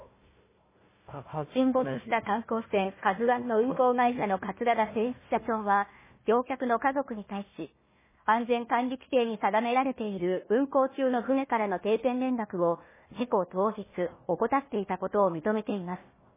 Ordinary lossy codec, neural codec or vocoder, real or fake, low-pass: MP3, 16 kbps; codec, 16 kHz, 1 kbps, FunCodec, trained on Chinese and English, 50 frames a second; fake; 3.6 kHz